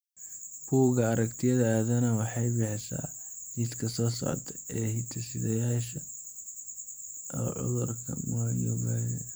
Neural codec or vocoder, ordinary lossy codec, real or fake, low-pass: none; none; real; none